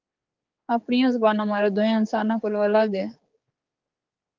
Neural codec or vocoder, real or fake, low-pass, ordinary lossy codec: codec, 16 kHz, 4 kbps, X-Codec, HuBERT features, trained on general audio; fake; 7.2 kHz; Opus, 32 kbps